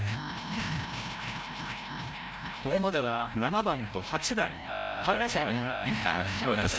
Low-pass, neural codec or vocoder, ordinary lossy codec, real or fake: none; codec, 16 kHz, 0.5 kbps, FreqCodec, larger model; none; fake